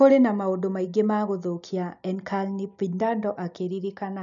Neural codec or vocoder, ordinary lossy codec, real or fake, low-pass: none; none; real; 7.2 kHz